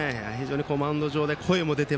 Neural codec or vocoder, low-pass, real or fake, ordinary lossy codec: none; none; real; none